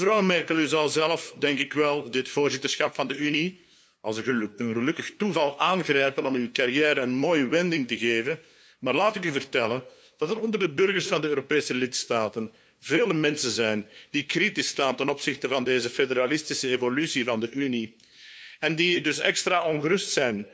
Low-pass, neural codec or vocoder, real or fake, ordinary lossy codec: none; codec, 16 kHz, 2 kbps, FunCodec, trained on LibriTTS, 25 frames a second; fake; none